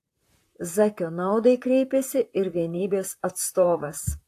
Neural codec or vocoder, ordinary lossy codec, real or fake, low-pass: vocoder, 44.1 kHz, 128 mel bands, Pupu-Vocoder; AAC, 64 kbps; fake; 14.4 kHz